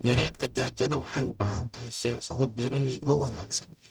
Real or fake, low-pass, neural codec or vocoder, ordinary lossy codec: fake; 19.8 kHz; codec, 44.1 kHz, 0.9 kbps, DAC; none